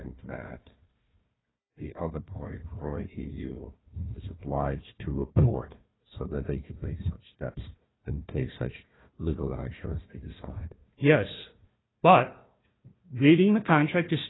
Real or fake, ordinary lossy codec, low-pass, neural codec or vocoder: fake; AAC, 16 kbps; 7.2 kHz; codec, 16 kHz, 1 kbps, FunCodec, trained on Chinese and English, 50 frames a second